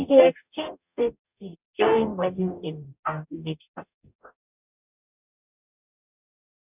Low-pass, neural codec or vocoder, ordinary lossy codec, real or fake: 3.6 kHz; codec, 44.1 kHz, 0.9 kbps, DAC; none; fake